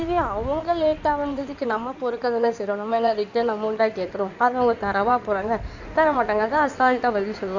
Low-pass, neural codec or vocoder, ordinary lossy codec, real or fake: 7.2 kHz; codec, 16 kHz in and 24 kHz out, 2.2 kbps, FireRedTTS-2 codec; none; fake